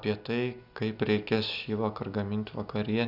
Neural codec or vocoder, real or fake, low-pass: none; real; 5.4 kHz